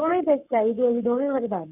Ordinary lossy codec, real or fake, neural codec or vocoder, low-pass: none; fake; codec, 44.1 kHz, 7.8 kbps, Pupu-Codec; 3.6 kHz